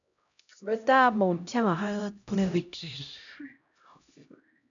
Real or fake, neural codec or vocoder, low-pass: fake; codec, 16 kHz, 0.5 kbps, X-Codec, HuBERT features, trained on LibriSpeech; 7.2 kHz